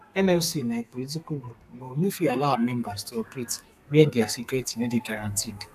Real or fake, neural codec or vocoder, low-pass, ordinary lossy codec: fake; codec, 32 kHz, 1.9 kbps, SNAC; 14.4 kHz; none